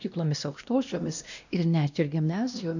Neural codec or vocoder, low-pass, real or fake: codec, 16 kHz, 1 kbps, X-Codec, WavLM features, trained on Multilingual LibriSpeech; 7.2 kHz; fake